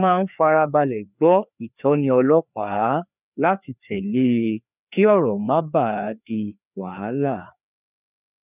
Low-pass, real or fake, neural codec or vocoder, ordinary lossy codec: 3.6 kHz; fake; codec, 16 kHz, 2 kbps, FreqCodec, larger model; none